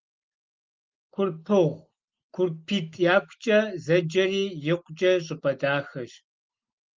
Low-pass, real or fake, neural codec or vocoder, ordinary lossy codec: 7.2 kHz; real; none; Opus, 32 kbps